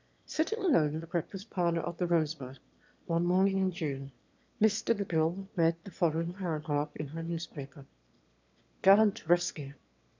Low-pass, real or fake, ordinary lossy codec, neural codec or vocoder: 7.2 kHz; fake; MP3, 64 kbps; autoencoder, 22.05 kHz, a latent of 192 numbers a frame, VITS, trained on one speaker